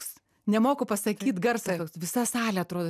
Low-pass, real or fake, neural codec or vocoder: 14.4 kHz; real; none